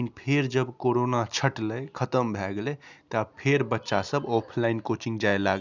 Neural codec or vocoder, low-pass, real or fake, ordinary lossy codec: none; 7.2 kHz; real; none